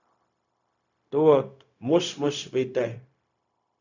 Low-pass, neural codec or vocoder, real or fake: 7.2 kHz; codec, 16 kHz, 0.4 kbps, LongCat-Audio-Codec; fake